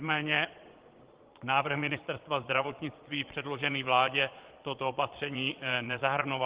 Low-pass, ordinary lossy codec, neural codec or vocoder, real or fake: 3.6 kHz; Opus, 16 kbps; vocoder, 22.05 kHz, 80 mel bands, Vocos; fake